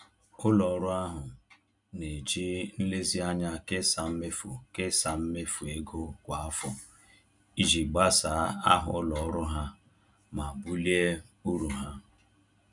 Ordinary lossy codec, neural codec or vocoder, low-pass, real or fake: none; none; 10.8 kHz; real